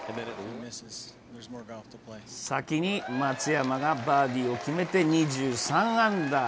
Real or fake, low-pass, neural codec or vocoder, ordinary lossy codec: real; none; none; none